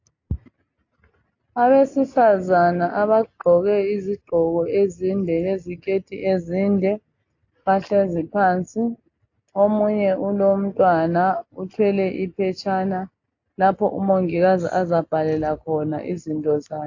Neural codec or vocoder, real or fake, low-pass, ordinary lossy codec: none; real; 7.2 kHz; AAC, 48 kbps